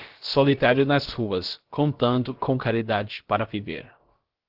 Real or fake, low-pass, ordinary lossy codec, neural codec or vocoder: fake; 5.4 kHz; Opus, 16 kbps; codec, 16 kHz, about 1 kbps, DyCAST, with the encoder's durations